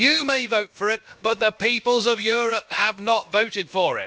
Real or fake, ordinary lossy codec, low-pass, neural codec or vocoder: fake; none; none; codec, 16 kHz, about 1 kbps, DyCAST, with the encoder's durations